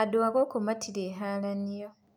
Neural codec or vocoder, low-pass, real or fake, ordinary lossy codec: none; 19.8 kHz; real; none